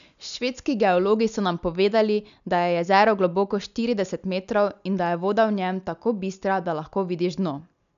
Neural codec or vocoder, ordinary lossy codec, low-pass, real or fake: none; none; 7.2 kHz; real